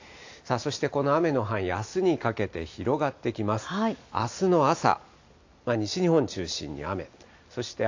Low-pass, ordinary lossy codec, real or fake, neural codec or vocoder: 7.2 kHz; AAC, 48 kbps; real; none